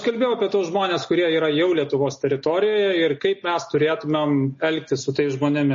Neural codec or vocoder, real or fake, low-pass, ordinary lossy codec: none; real; 7.2 kHz; MP3, 32 kbps